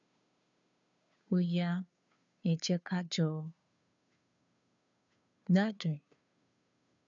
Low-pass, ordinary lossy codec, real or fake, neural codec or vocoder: 7.2 kHz; none; fake; codec, 16 kHz, 2 kbps, FunCodec, trained on Chinese and English, 25 frames a second